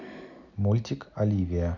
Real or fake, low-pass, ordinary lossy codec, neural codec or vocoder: real; 7.2 kHz; none; none